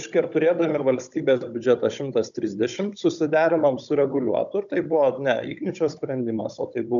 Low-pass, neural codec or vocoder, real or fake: 7.2 kHz; codec, 16 kHz, 16 kbps, FunCodec, trained on Chinese and English, 50 frames a second; fake